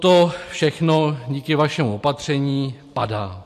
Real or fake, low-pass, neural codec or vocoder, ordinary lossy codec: real; 14.4 kHz; none; MP3, 64 kbps